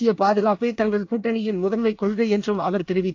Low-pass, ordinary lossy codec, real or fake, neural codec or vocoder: 7.2 kHz; AAC, 48 kbps; fake; codec, 24 kHz, 1 kbps, SNAC